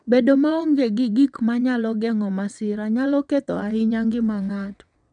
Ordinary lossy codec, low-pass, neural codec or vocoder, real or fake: none; 9.9 kHz; vocoder, 22.05 kHz, 80 mel bands, WaveNeXt; fake